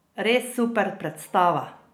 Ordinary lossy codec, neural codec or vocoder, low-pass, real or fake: none; none; none; real